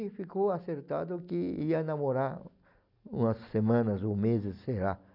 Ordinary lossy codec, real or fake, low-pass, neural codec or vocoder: none; real; 5.4 kHz; none